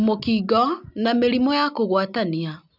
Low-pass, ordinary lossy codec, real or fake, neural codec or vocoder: 5.4 kHz; none; real; none